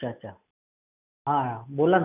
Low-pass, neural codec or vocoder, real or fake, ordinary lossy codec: 3.6 kHz; none; real; none